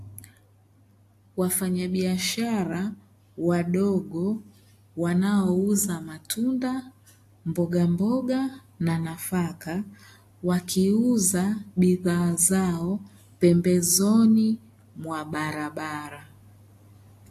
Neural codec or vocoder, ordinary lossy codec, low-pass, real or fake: none; AAC, 64 kbps; 14.4 kHz; real